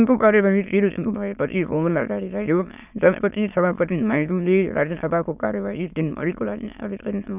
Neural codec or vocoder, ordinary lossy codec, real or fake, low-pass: autoencoder, 22.05 kHz, a latent of 192 numbers a frame, VITS, trained on many speakers; none; fake; 3.6 kHz